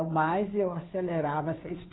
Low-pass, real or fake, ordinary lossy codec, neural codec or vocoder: 7.2 kHz; fake; AAC, 16 kbps; codec, 16 kHz, 2 kbps, FunCodec, trained on Chinese and English, 25 frames a second